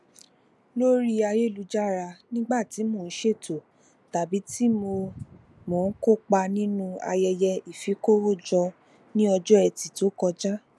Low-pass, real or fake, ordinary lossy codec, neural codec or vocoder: none; real; none; none